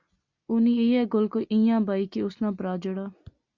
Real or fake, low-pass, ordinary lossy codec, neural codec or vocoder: real; 7.2 kHz; Opus, 64 kbps; none